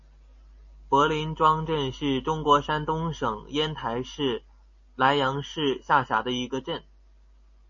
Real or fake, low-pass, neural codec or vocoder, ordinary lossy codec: real; 7.2 kHz; none; MP3, 32 kbps